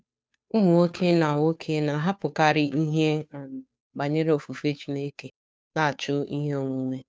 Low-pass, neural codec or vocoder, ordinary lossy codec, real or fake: none; codec, 16 kHz, 2 kbps, FunCodec, trained on Chinese and English, 25 frames a second; none; fake